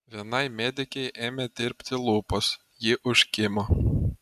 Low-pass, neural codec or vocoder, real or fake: 14.4 kHz; none; real